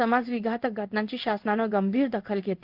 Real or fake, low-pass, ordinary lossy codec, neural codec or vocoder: fake; 5.4 kHz; Opus, 32 kbps; codec, 16 kHz in and 24 kHz out, 1 kbps, XY-Tokenizer